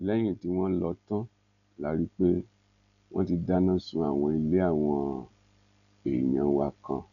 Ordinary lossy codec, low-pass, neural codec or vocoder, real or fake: none; 7.2 kHz; none; real